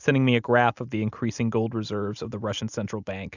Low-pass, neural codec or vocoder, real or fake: 7.2 kHz; none; real